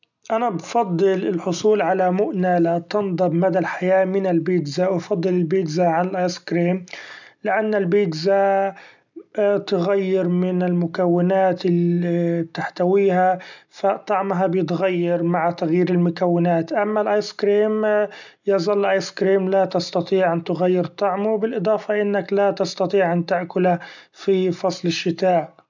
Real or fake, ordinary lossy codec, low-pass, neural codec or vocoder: real; none; 7.2 kHz; none